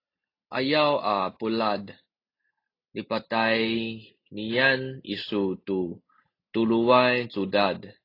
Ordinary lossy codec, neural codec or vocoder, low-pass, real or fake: AAC, 32 kbps; none; 5.4 kHz; real